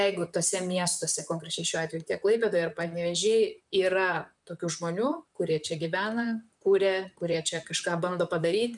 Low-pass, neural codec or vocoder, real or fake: 10.8 kHz; vocoder, 44.1 kHz, 128 mel bands, Pupu-Vocoder; fake